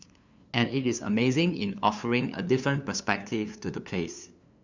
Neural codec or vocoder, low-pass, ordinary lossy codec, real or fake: codec, 16 kHz, 2 kbps, FunCodec, trained on LibriTTS, 25 frames a second; 7.2 kHz; Opus, 64 kbps; fake